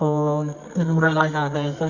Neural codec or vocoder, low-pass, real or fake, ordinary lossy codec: codec, 24 kHz, 0.9 kbps, WavTokenizer, medium music audio release; 7.2 kHz; fake; none